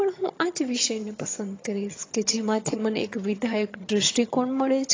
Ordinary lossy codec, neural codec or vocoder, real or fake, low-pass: AAC, 32 kbps; vocoder, 22.05 kHz, 80 mel bands, HiFi-GAN; fake; 7.2 kHz